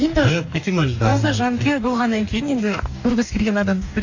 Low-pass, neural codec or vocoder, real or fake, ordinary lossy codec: 7.2 kHz; codec, 44.1 kHz, 2.6 kbps, DAC; fake; AAC, 48 kbps